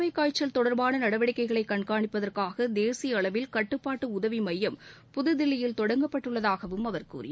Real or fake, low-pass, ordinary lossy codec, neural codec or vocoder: real; none; none; none